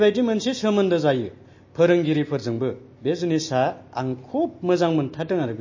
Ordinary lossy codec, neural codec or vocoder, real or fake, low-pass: MP3, 32 kbps; none; real; 7.2 kHz